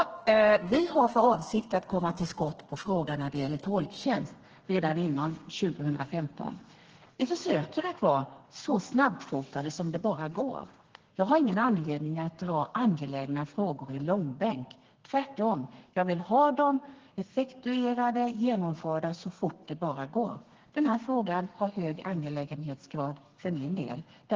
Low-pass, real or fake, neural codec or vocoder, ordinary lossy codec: 7.2 kHz; fake; codec, 32 kHz, 1.9 kbps, SNAC; Opus, 16 kbps